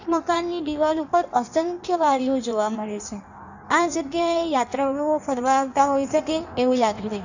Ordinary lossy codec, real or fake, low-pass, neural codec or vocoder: none; fake; 7.2 kHz; codec, 16 kHz in and 24 kHz out, 1.1 kbps, FireRedTTS-2 codec